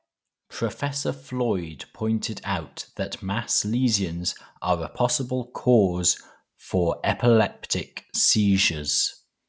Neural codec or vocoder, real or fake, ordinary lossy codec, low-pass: none; real; none; none